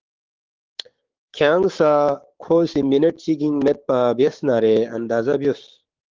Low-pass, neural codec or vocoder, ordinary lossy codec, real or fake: 7.2 kHz; codec, 16 kHz, 6 kbps, DAC; Opus, 16 kbps; fake